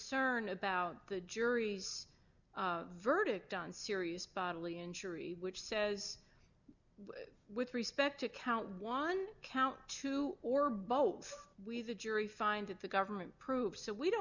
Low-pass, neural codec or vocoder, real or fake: 7.2 kHz; none; real